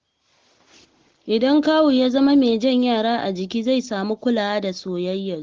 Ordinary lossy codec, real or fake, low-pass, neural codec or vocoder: Opus, 16 kbps; real; 7.2 kHz; none